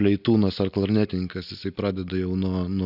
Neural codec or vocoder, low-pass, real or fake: none; 5.4 kHz; real